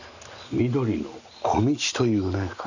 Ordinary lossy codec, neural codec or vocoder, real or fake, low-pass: none; none; real; 7.2 kHz